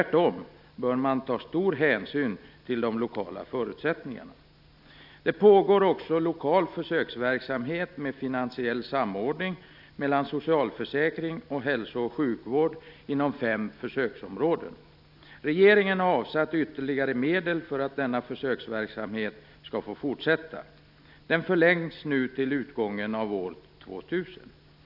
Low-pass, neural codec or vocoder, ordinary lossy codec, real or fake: 5.4 kHz; none; none; real